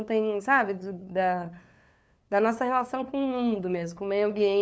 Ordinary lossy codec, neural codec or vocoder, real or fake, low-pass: none; codec, 16 kHz, 2 kbps, FunCodec, trained on LibriTTS, 25 frames a second; fake; none